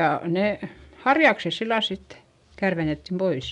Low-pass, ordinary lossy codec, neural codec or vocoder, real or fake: 10.8 kHz; none; none; real